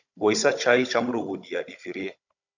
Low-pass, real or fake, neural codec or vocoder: 7.2 kHz; fake; codec, 16 kHz, 16 kbps, FunCodec, trained on Chinese and English, 50 frames a second